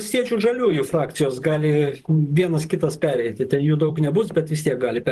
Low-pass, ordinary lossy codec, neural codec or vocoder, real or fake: 14.4 kHz; Opus, 24 kbps; vocoder, 44.1 kHz, 128 mel bands every 512 samples, BigVGAN v2; fake